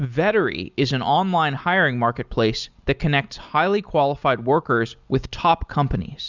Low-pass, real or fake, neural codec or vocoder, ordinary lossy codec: 7.2 kHz; real; none; Opus, 64 kbps